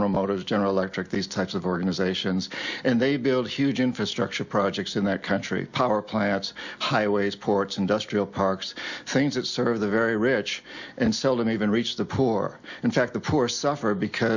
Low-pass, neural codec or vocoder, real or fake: 7.2 kHz; none; real